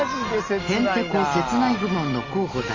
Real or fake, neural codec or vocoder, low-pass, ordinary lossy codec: real; none; 7.2 kHz; Opus, 32 kbps